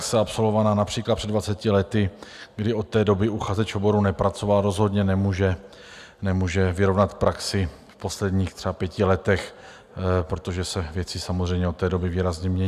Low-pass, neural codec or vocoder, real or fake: 14.4 kHz; none; real